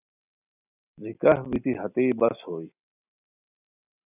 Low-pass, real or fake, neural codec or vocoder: 3.6 kHz; real; none